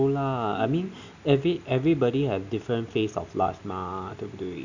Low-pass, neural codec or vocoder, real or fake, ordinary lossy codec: 7.2 kHz; none; real; none